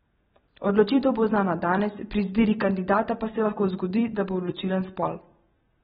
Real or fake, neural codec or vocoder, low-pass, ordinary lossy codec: real; none; 19.8 kHz; AAC, 16 kbps